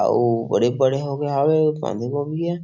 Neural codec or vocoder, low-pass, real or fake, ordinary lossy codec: none; 7.2 kHz; real; none